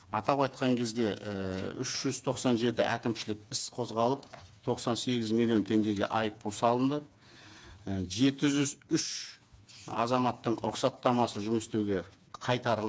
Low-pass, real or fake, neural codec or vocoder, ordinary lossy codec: none; fake; codec, 16 kHz, 4 kbps, FreqCodec, smaller model; none